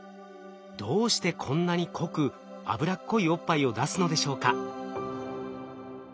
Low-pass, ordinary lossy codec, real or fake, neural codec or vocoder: none; none; real; none